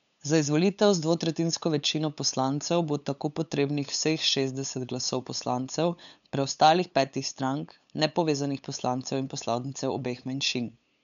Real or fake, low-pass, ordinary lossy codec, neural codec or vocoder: fake; 7.2 kHz; none; codec, 16 kHz, 8 kbps, FunCodec, trained on LibriTTS, 25 frames a second